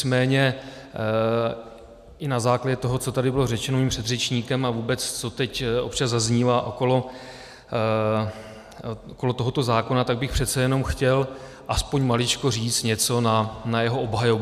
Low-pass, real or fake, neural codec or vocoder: 14.4 kHz; real; none